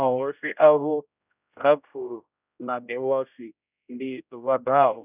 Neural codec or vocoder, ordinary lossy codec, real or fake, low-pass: codec, 16 kHz, 0.5 kbps, X-Codec, HuBERT features, trained on general audio; none; fake; 3.6 kHz